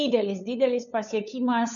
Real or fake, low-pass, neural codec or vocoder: fake; 7.2 kHz; codec, 16 kHz, 8 kbps, FunCodec, trained on LibriTTS, 25 frames a second